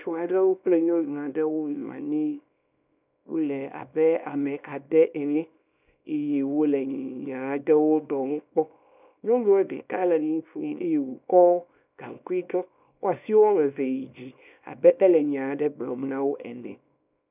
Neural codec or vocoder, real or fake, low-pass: codec, 24 kHz, 0.9 kbps, WavTokenizer, small release; fake; 3.6 kHz